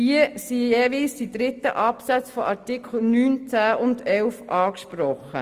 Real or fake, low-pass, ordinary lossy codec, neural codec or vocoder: real; 14.4 kHz; Opus, 32 kbps; none